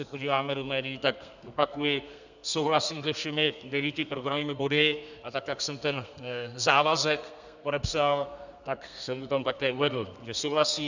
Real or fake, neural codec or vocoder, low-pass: fake; codec, 32 kHz, 1.9 kbps, SNAC; 7.2 kHz